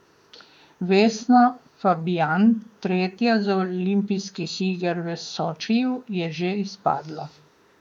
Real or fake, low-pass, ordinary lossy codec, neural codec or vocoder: fake; 19.8 kHz; MP3, 96 kbps; autoencoder, 48 kHz, 32 numbers a frame, DAC-VAE, trained on Japanese speech